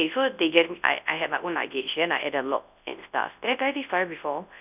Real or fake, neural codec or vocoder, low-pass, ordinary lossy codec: fake; codec, 24 kHz, 0.9 kbps, WavTokenizer, large speech release; 3.6 kHz; none